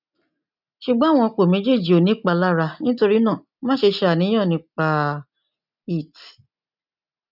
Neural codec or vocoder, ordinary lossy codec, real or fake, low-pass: none; none; real; 5.4 kHz